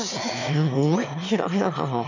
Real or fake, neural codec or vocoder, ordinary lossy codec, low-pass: fake; autoencoder, 22.05 kHz, a latent of 192 numbers a frame, VITS, trained on one speaker; none; 7.2 kHz